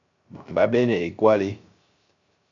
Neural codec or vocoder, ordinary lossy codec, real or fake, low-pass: codec, 16 kHz, 0.3 kbps, FocalCodec; AAC, 48 kbps; fake; 7.2 kHz